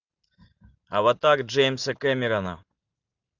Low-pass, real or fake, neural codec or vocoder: 7.2 kHz; real; none